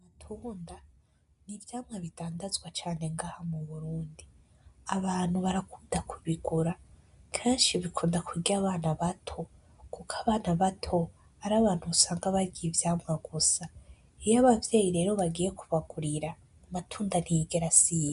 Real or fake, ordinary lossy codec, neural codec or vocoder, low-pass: real; MP3, 96 kbps; none; 10.8 kHz